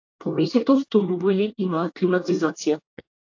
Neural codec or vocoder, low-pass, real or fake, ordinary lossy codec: codec, 24 kHz, 1 kbps, SNAC; 7.2 kHz; fake; AAC, 48 kbps